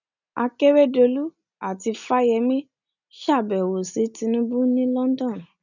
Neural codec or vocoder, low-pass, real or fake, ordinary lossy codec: none; 7.2 kHz; real; none